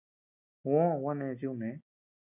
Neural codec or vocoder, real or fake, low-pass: none; real; 3.6 kHz